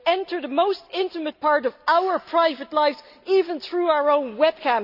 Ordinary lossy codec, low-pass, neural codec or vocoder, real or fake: none; 5.4 kHz; none; real